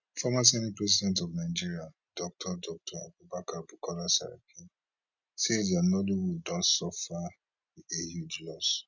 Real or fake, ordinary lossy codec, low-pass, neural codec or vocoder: real; none; 7.2 kHz; none